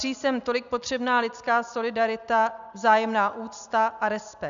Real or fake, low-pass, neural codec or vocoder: real; 7.2 kHz; none